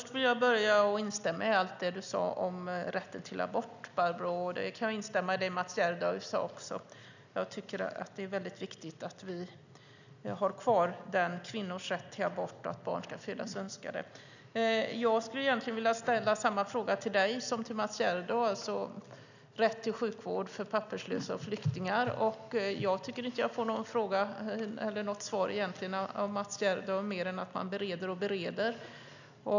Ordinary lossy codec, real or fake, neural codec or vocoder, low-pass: none; real; none; 7.2 kHz